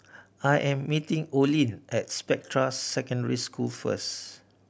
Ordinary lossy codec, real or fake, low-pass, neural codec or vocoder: none; real; none; none